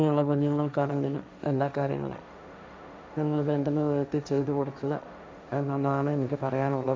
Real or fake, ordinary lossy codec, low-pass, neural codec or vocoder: fake; none; none; codec, 16 kHz, 1.1 kbps, Voila-Tokenizer